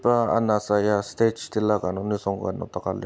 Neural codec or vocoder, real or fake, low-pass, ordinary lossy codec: none; real; none; none